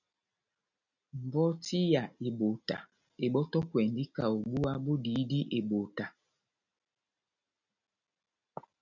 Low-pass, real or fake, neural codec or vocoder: 7.2 kHz; real; none